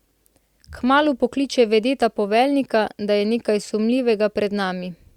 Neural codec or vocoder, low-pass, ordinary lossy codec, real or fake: none; 19.8 kHz; Opus, 64 kbps; real